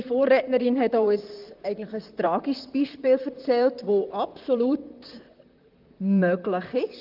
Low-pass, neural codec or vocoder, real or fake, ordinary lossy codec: 5.4 kHz; vocoder, 44.1 kHz, 128 mel bands, Pupu-Vocoder; fake; Opus, 32 kbps